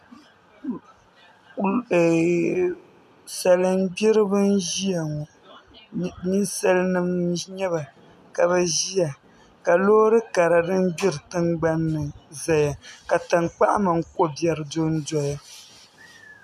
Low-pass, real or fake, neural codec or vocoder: 14.4 kHz; real; none